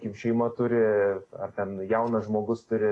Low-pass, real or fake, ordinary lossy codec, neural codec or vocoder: 9.9 kHz; real; AAC, 32 kbps; none